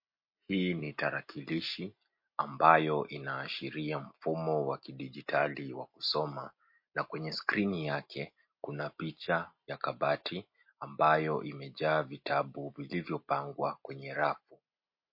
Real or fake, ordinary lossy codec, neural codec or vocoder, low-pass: real; MP3, 32 kbps; none; 5.4 kHz